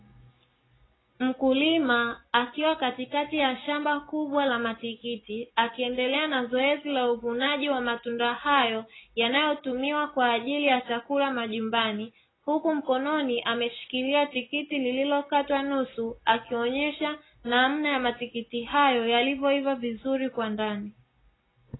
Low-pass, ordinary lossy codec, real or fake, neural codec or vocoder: 7.2 kHz; AAC, 16 kbps; real; none